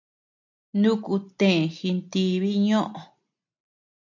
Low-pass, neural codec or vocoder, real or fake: 7.2 kHz; none; real